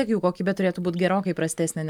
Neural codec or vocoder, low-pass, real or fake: none; 19.8 kHz; real